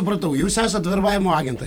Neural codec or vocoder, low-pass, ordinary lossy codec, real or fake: vocoder, 44.1 kHz, 128 mel bands every 512 samples, BigVGAN v2; 19.8 kHz; MP3, 96 kbps; fake